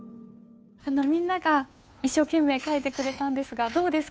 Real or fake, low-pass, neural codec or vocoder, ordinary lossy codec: fake; none; codec, 16 kHz, 2 kbps, FunCodec, trained on Chinese and English, 25 frames a second; none